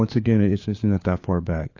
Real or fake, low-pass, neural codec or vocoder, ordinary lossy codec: fake; 7.2 kHz; codec, 16 kHz, 4 kbps, FunCodec, trained on LibriTTS, 50 frames a second; AAC, 48 kbps